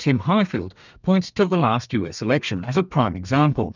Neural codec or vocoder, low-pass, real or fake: codec, 32 kHz, 1.9 kbps, SNAC; 7.2 kHz; fake